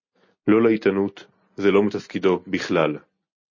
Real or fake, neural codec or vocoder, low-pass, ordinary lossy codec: real; none; 7.2 kHz; MP3, 32 kbps